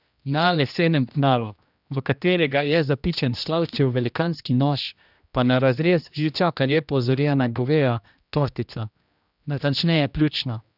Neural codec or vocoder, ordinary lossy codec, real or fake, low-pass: codec, 16 kHz, 1 kbps, X-Codec, HuBERT features, trained on general audio; none; fake; 5.4 kHz